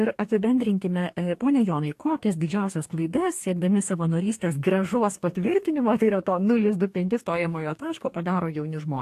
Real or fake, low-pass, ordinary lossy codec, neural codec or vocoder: fake; 14.4 kHz; AAC, 64 kbps; codec, 44.1 kHz, 2.6 kbps, DAC